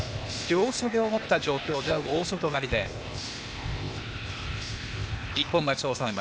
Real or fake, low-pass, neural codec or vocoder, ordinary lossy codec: fake; none; codec, 16 kHz, 0.8 kbps, ZipCodec; none